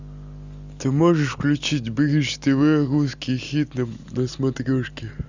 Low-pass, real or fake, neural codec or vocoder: 7.2 kHz; real; none